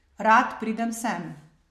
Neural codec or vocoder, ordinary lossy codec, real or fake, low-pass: none; MP3, 64 kbps; real; 14.4 kHz